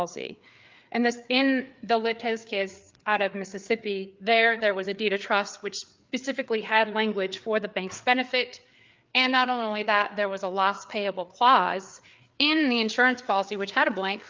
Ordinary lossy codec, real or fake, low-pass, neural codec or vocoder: Opus, 24 kbps; fake; 7.2 kHz; codec, 16 kHz, 4 kbps, FreqCodec, larger model